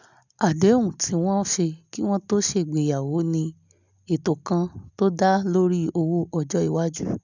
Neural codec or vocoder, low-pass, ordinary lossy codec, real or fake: none; 7.2 kHz; none; real